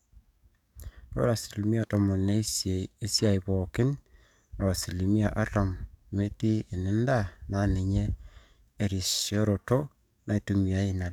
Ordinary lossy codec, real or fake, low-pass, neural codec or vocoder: none; fake; 19.8 kHz; codec, 44.1 kHz, 7.8 kbps, DAC